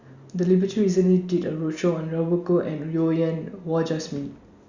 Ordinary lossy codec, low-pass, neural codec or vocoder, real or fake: Opus, 64 kbps; 7.2 kHz; none; real